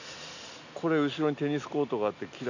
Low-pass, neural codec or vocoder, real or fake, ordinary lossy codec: 7.2 kHz; none; real; none